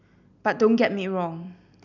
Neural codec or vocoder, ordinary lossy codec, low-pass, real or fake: none; none; 7.2 kHz; real